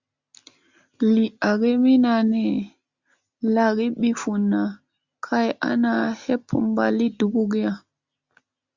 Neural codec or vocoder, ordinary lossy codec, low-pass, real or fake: none; Opus, 64 kbps; 7.2 kHz; real